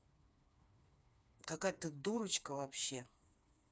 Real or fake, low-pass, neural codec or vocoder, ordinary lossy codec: fake; none; codec, 16 kHz, 4 kbps, FreqCodec, smaller model; none